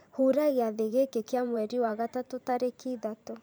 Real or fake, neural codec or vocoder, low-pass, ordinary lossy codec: fake; vocoder, 44.1 kHz, 128 mel bands every 512 samples, BigVGAN v2; none; none